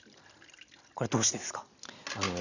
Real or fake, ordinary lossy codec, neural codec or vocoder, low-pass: real; none; none; 7.2 kHz